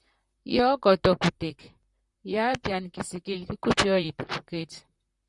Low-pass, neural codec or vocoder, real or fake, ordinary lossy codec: 10.8 kHz; vocoder, 44.1 kHz, 128 mel bands, Pupu-Vocoder; fake; Opus, 64 kbps